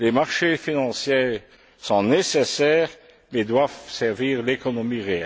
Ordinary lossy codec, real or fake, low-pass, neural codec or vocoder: none; real; none; none